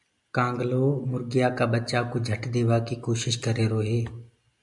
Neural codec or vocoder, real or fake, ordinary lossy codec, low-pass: none; real; MP3, 96 kbps; 10.8 kHz